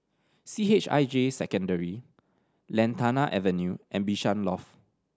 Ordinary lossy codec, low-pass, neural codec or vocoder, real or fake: none; none; none; real